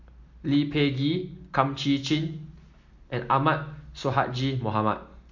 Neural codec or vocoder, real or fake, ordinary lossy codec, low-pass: none; real; MP3, 48 kbps; 7.2 kHz